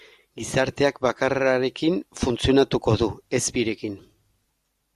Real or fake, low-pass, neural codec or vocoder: real; 14.4 kHz; none